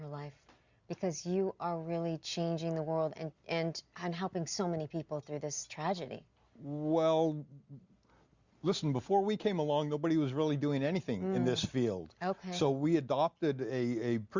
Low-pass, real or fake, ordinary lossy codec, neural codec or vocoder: 7.2 kHz; real; Opus, 64 kbps; none